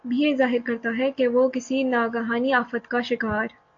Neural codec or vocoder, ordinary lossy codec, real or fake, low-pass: none; AAC, 48 kbps; real; 7.2 kHz